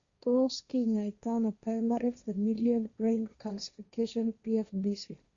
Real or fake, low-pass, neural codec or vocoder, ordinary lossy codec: fake; 7.2 kHz; codec, 16 kHz, 1.1 kbps, Voila-Tokenizer; Opus, 64 kbps